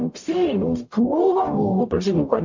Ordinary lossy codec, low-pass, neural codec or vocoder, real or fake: MP3, 48 kbps; 7.2 kHz; codec, 44.1 kHz, 0.9 kbps, DAC; fake